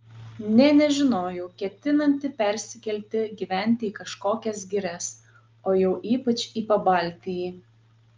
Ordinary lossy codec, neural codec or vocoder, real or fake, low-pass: Opus, 24 kbps; none; real; 7.2 kHz